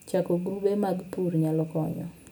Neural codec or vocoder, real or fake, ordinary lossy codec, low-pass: none; real; none; none